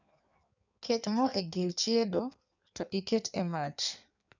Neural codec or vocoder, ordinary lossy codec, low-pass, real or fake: codec, 16 kHz in and 24 kHz out, 1.1 kbps, FireRedTTS-2 codec; none; 7.2 kHz; fake